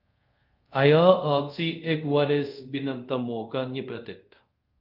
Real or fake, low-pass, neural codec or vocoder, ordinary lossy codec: fake; 5.4 kHz; codec, 24 kHz, 0.5 kbps, DualCodec; Opus, 24 kbps